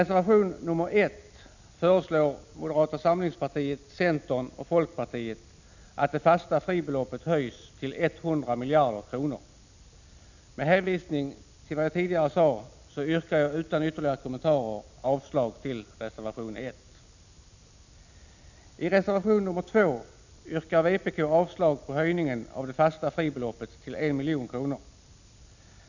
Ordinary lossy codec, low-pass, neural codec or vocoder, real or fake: none; 7.2 kHz; none; real